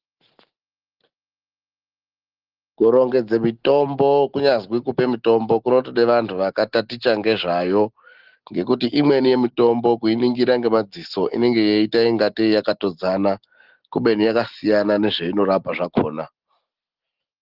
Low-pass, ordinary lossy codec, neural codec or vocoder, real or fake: 5.4 kHz; Opus, 16 kbps; none; real